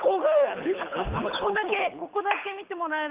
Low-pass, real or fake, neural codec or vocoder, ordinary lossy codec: 3.6 kHz; fake; codec, 24 kHz, 6 kbps, HILCodec; Opus, 32 kbps